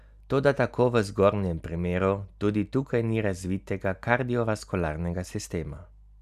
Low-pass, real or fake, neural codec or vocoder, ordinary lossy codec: 14.4 kHz; real; none; none